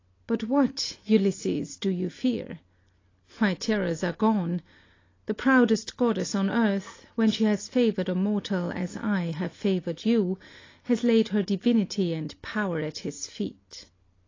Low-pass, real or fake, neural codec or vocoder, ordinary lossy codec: 7.2 kHz; real; none; AAC, 32 kbps